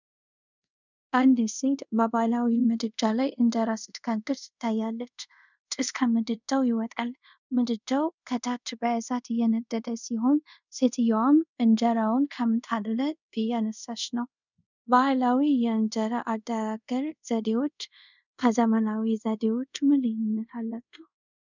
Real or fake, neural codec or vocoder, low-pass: fake; codec, 24 kHz, 0.5 kbps, DualCodec; 7.2 kHz